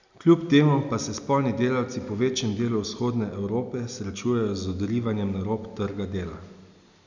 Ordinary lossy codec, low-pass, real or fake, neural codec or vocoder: none; 7.2 kHz; real; none